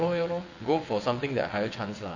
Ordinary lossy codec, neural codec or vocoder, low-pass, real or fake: none; vocoder, 22.05 kHz, 80 mel bands, WaveNeXt; 7.2 kHz; fake